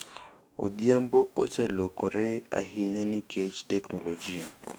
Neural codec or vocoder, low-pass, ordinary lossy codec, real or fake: codec, 44.1 kHz, 2.6 kbps, DAC; none; none; fake